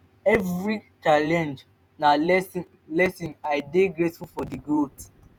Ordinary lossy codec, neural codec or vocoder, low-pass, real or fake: none; vocoder, 48 kHz, 128 mel bands, Vocos; none; fake